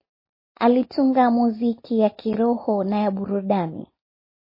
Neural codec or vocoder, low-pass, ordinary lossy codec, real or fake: none; 5.4 kHz; MP3, 24 kbps; real